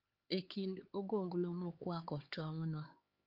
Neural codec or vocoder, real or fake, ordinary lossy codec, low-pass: codec, 16 kHz, 4 kbps, X-Codec, HuBERT features, trained on LibriSpeech; fake; Opus, 32 kbps; 5.4 kHz